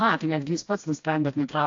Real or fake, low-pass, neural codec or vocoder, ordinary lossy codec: fake; 7.2 kHz; codec, 16 kHz, 1 kbps, FreqCodec, smaller model; AAC, 48 kbps